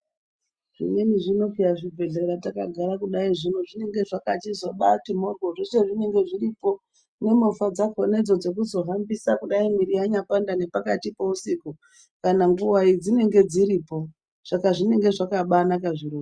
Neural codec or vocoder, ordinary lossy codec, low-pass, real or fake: none; Opus, 64 kbps; 9.9 kHz; real